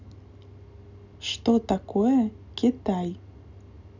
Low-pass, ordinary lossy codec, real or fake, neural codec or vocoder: 7.2 kHz; none; real; none